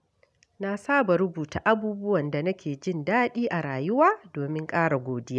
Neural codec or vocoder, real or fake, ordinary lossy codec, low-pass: none; real; none; none